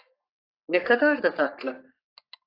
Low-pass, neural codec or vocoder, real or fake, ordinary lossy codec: 5.4 kHz; codec, 44.1 kHz, 3.4 kbps, Pupu-Codec; fake; AAC, 32 kbps